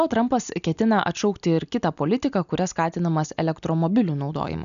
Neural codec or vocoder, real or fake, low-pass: none; real; 7.2 kHz